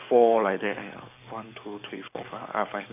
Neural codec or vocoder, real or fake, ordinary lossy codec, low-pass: codec, 44.1 kHz, 7.8 kbps, DAC; fake; none; 3.6 kHz